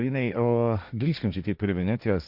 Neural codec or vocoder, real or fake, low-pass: codec, 16 kHz, 1.1 kbps, Voila-Tokenizer; fake; 5.4 kHz